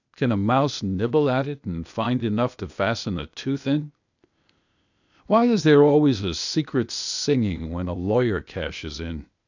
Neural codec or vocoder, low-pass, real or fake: codec, 16 kHz, 0.8 kbps, ZipCodec; 7.2 kHz; fake